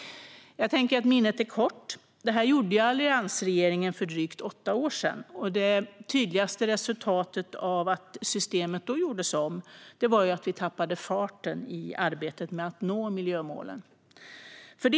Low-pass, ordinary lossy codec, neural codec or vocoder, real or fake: none; none; none; real